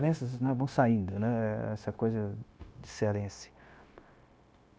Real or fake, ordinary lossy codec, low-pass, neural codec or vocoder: fake; none; none; codec, 16 kHz, 0.9 kbps, LongCat-Audio-Codec